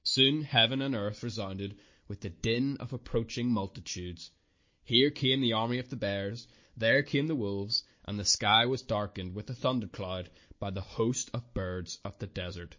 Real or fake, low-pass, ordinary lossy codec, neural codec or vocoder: real; 7.2 kHz; MP3, 32 kbps; none